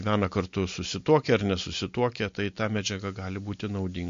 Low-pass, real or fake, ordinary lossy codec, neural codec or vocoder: 7.2 kHz; real; MP3, 48 kbps; none